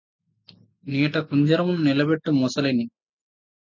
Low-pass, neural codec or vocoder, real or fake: 7.2 kHz; none; real